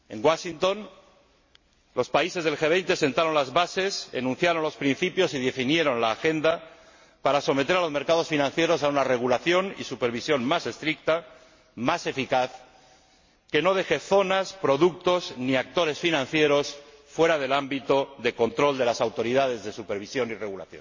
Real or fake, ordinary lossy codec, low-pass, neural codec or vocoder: real; MP3, 32 kbps; 7.2 kHz; none